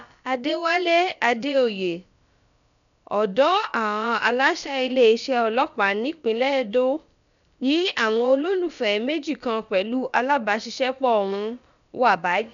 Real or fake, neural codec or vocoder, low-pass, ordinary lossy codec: fake; codec, 16 kHz, about 1 kbps, DyCAST, with the encoder's durations; 7.2 kHz; none